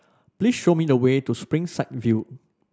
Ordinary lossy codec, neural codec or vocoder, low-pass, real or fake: none; none; none; real